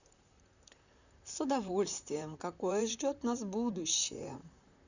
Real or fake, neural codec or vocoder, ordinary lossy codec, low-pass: fake; vocoder, 44.1 kHz, 128 mel bands, Pupu-Vocoder; none; 7.2 kHz